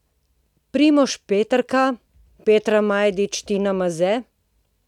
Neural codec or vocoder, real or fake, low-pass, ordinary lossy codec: none; real; 19.8 kHz; none